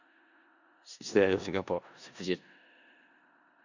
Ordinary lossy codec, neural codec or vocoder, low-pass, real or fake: none; codec, 16 kHz in and 24 kHz out, 0.4 kbps, LongCat-Audio-Codec, four codebook decoder; 7.2 kHz; fake